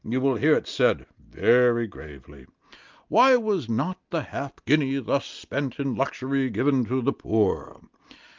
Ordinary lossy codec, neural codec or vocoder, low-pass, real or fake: Opus, 24 kbps; none; 7.2 kHz; real